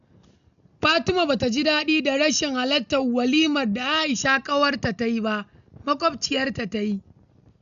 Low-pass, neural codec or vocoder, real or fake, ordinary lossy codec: 7.2 kHz; none; real; none